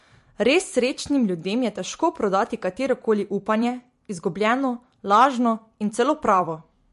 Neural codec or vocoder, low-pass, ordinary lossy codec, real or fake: vocoder, 44.1 kHz, 128 mel bands every 256 samples, BigVGAN v2; 14.4 kHz; MP3, 48 kbps; fake